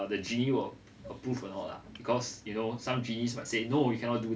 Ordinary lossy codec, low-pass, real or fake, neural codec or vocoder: none; none; real; none